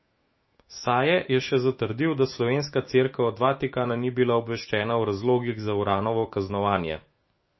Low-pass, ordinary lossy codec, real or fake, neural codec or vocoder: 7.2 kHz; MP3, 24 kbps; fake; autoencoder, 48 kHz, 128 numbers a frame, DAC-VAE, trained on Japanese speech